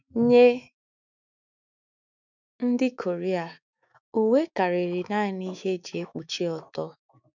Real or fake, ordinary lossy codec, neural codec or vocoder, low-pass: fake; none; autoencoder, 48 kHz, 128 numbers a frame, DAC-VAE, trained on Japanese speech; 7.2 kHz